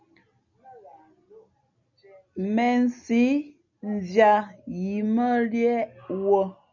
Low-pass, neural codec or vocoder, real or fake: 7.2 kHz; none; real